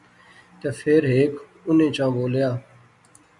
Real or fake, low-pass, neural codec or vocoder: real; 10.8 kHz; none